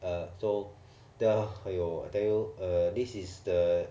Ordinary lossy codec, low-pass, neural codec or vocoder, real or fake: none; none; none; real